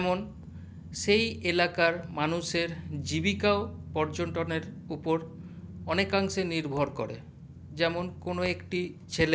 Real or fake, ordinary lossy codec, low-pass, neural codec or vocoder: real; none; none; none